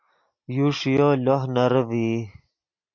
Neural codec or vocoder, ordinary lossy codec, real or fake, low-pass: none; MP3, 48 kbps; real; 7.2 kHz